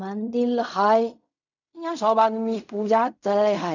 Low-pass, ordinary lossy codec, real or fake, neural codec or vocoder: 7.2 kHz; none; fake; codec, 16 kHz in and 24 kHz out, 0.4 kbps, LongCat-Audio-Codec, fine tuned four codebook decoder